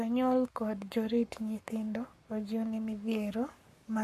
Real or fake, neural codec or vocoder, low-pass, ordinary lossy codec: fake; codec, 44.1 kHz, 7.8 kbps, Pupu-Codec; 14.4 kHz; MP3, 64 kbps